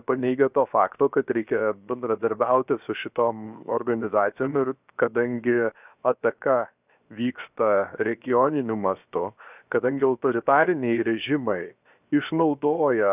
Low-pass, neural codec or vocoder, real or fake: 3.6 kHz; codec, 16 kHz, 0.7 kbps, FocalCodec; fake